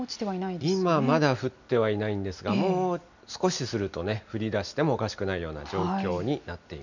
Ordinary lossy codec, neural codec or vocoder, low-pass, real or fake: none; none; 7.2 kHz; real